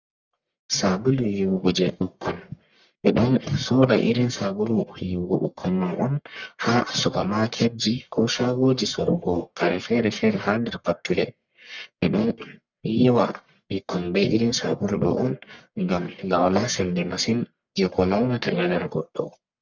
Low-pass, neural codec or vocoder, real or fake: 7.2 kHz; codec, 44.1 kHz, 1.7 kbps, Pupu-Codec; fake